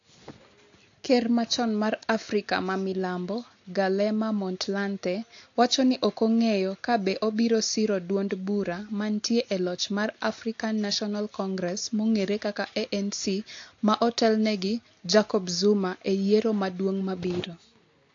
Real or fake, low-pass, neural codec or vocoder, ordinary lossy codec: real; 7.2 kHz; none; AAC, 48 kbps